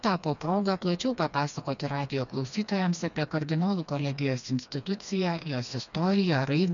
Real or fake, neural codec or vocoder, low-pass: fake; codec, 16 kHz, 2 kbps, FreqCodec, smaller model; 7.2 kHz